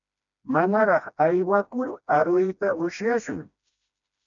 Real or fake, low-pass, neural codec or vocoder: fake; 7.2 kHz; codec, 16 kHz, 1 kbps, FreqCodec, smaller model